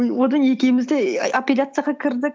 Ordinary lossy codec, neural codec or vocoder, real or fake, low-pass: none; none; real; none